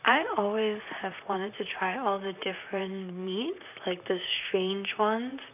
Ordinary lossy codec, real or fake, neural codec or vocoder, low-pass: none; fake; vocoder, 44.1 kHz, 128 mel bands, Pupu-Vocoder; 3.6 kHz